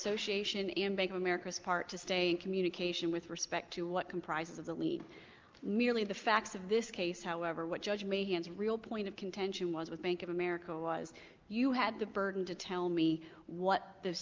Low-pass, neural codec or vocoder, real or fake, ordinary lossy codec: 7.2 kHz; none; real; Opus, 32 kbps